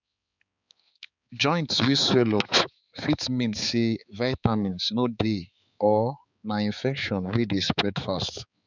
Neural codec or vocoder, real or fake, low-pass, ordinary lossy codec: codec, 16 kHz, 4 kbps, X-Codec, HuBERT features, trained on balanced general audio; fake; 7.2 kHz; none